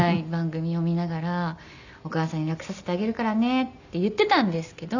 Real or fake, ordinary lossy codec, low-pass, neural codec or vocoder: real; none; 7.2 kHz; none